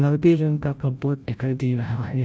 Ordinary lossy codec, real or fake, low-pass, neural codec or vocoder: none; fake; none; codec, 16 kHz, 0.5 kbps, FreqCodec, larger model